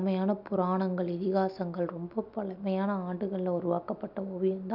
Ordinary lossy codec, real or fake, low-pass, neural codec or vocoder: none; real; 5.4 kHz; none